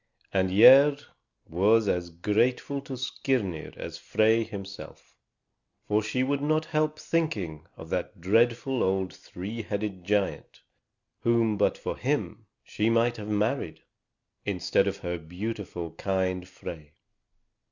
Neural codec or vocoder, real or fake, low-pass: none; real; 7.2 kHz